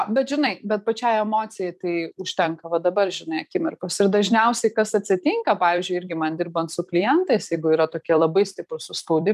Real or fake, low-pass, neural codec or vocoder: fake; 14.4 kHz; vocoder, 44.1 kHz, 128 mel bands every 256 samples, BigVGAN v2